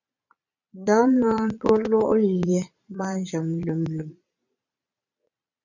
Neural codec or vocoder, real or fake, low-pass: vocoder, 22.05 kHz, 80 mel bands, Vocos; fake; 7.2 kHz